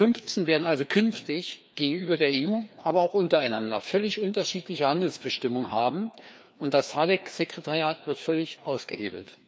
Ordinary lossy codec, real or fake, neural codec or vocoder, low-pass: none; fake; codec, 16 kHz, 2 kbps, FreqCodec, larger model; none